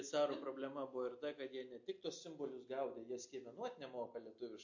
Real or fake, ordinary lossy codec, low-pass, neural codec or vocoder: real; MP3, 48 kbps; 7.2 kHz; none